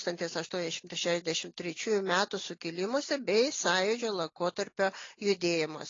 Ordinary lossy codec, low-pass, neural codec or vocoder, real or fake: AAC, 32 kbps; 7.2 kHz; none; real